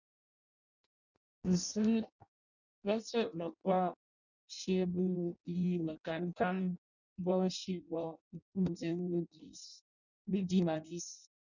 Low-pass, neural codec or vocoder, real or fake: 7.2 kHz; codec, 16 kHz in and 24 kHz out, 0.6 kbps, FireRedTTS-2 codec; fake